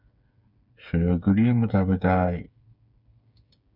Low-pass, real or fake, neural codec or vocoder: 5.4 kHz; fake; codec, 16 kHz, 8 kbps, FreqCodec, smaller model